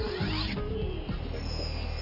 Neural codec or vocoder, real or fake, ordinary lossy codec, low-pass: none; real; none; 5.4 kHz